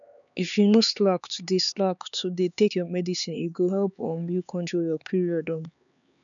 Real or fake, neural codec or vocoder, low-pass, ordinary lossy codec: fake; codec, 16 kHz, 4 kbps, X-Codec, HuBERT features, trained on LibriSpeech; 7.2 kHz; none